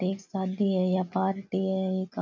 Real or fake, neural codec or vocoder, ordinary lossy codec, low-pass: real; none; none; 7.2 kHz